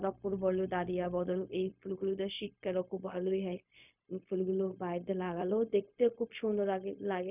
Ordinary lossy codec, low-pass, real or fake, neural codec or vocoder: none; 3.6 kHz; fake; codec, 16 kHz, 0.4 kbps, LongCat-Audio-Codec